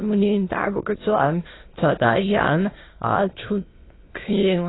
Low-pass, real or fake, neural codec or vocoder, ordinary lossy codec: 7.2 kHz; fake; autoencoder, 22.05 kHz, a latent of 192 numbers a frame, VITS, trained on many speakers; AAC, 16 kbps